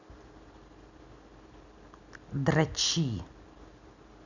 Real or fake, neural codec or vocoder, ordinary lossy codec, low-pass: real; none; none; 7.2 kHz